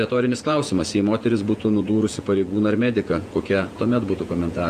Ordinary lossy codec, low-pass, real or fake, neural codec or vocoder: Opus, 64 kbps; 14.4 kHz; fake; vocoder, 48 kHz, 128 mel bands, Vocos